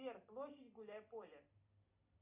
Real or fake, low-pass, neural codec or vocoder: real; 3.6 kHz; none